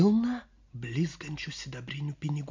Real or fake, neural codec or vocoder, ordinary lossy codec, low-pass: real; none; MP3, 48 kbps; 7.2 kHz